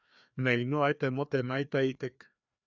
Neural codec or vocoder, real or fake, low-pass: codec, 16 kHz, 2 kbps, FreqCodec, larger model; fake; 7.2 kHz